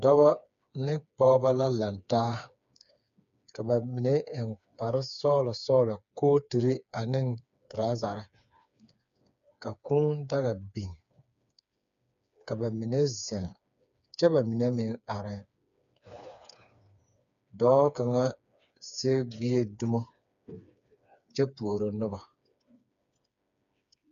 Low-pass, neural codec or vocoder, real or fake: 7.2 kHz; codec, 16 kHz, 4 kbps, FreqCodec, smaller model; fake